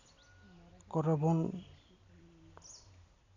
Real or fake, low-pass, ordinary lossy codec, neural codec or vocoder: real; 7.2 kHz; none; none